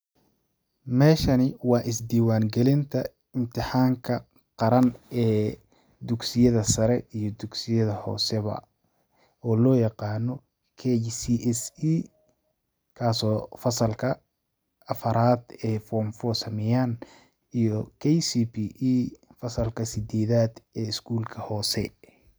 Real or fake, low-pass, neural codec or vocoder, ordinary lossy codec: real; none; none; none